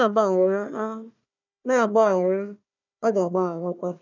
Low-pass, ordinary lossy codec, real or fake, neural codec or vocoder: 7.2 kHz; none; fake; codec, 16 kHz, 1 kbps, FunCodec, trained on Chinese and English, 50 frames a second